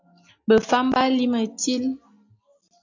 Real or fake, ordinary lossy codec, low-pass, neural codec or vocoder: real; AAC, 48 kbps; 7.2 kHz; none